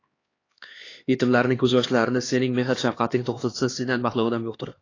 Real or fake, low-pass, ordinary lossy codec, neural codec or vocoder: fake; 7.2 kHz; AAC, 32 kbps; codec, 16 kHz, 2 kbps, X-Codec, HuBERT features, trained on LibriSpeech